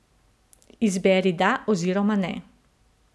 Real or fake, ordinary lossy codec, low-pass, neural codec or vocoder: real; none; none; none